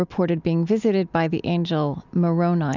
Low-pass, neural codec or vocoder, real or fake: 7.2 kHz; none; real